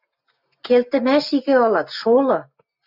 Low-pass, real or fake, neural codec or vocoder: 5.4 kHz; real; none